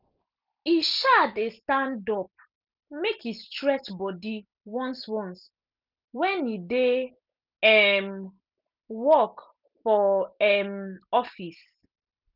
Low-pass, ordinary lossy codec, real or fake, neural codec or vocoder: 5.4 kHz; none; real; none